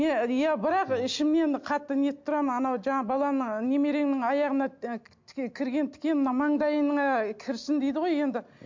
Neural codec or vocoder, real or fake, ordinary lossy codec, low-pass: none; real; MP3, 48 kbps; 7.2 kHz